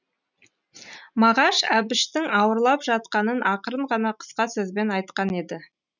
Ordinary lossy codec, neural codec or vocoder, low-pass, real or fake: none; none; 7.2 kHz; real